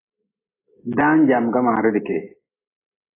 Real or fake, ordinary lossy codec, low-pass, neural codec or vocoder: real; AAC, 16 kbps; 3.6 kHz; none